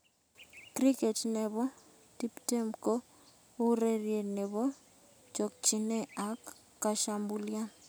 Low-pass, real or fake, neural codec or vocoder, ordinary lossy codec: none; real; none; none